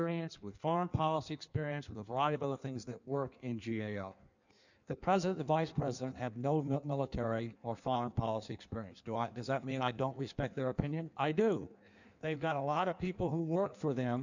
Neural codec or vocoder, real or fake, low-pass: codec, 16 kHz in and 24 kHz out, 1.1 kbps, FireRedTTS-2 codec; fake; 7.2 kHz